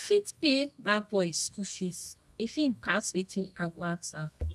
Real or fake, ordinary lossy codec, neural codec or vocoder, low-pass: fake; none; codec, 24 kHz, 0.9 kbps, WavTokenizer, medium music audio release; none